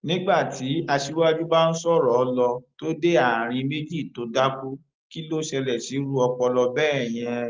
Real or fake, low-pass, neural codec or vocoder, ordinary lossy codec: real; 7.2 kHz; none; Opus, 32 kbps